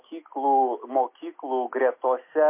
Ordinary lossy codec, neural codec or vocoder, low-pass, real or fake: MP3, 24 kbps; none; 3.6 kHz; real